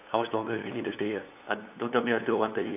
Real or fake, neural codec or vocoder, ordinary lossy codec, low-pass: fake; codec, 16 kHz, 8 kbps, FunCodec, trained on LibriTTS, 25 frames a second; none; 3.6 kHz